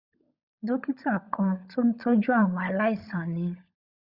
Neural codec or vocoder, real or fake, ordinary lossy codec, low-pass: codec, 16 kHz, 16 kbps, FunCodec, trained on LibriTTS, 50 frames a second; fake; Opus, 64 kbps; 5.4 kHz